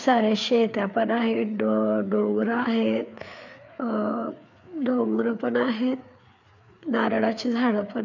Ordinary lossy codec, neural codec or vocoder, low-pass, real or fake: none; codec, 16 kHz, 4 kbps, FreqCodec, larger model; 7.2 kHz; fake